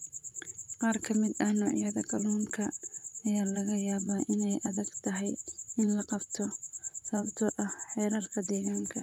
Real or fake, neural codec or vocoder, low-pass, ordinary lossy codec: fake; vocoder, 44.1 kHz, 128 mel bands, Pupu-Vocoder; 19.8 kHz; none